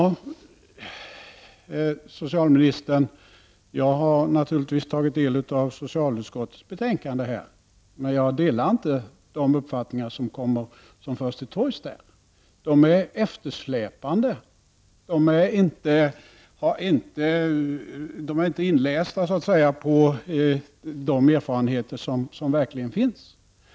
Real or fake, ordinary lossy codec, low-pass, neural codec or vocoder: real; none; none; none